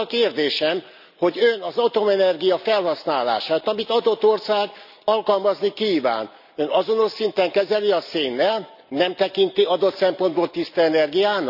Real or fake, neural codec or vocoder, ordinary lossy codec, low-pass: real; none; none; 5.4 kHz